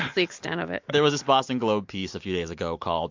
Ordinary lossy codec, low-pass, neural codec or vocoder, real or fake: MP3, 48 kbps; 7.2 kHz; none; real